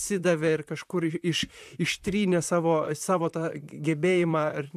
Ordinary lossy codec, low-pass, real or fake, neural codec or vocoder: AAC, 96 kbps; 14.4 kHz; fake; vocoder, 44.1 kHz, 128 mel bands, Pupu-Vocoder